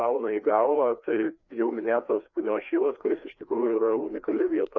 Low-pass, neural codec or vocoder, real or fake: 7.2 kHz; codec, 16 kHz, 2 kbps, FreqCodec, larger model; fake